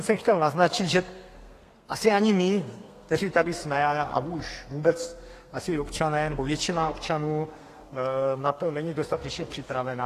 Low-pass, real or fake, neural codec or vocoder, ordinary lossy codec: 14.4 kHz; fake; codec, 32 kHz, 1.9 kbps, SNAC; AAC, 48 kbps